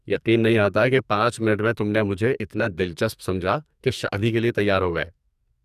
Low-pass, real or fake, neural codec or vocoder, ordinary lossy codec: 14.4 kHz; fake; codec, 44.1 kHz, 2.6 kbps, SNAC; none